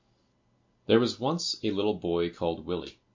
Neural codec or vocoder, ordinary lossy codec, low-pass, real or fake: none; MP3, 48 kbps; 7.2 kHz; real